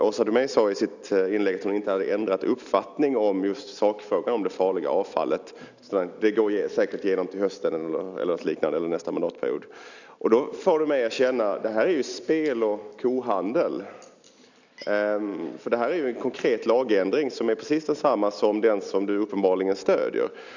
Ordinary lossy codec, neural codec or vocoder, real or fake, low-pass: none; none; real; 7.2 kHz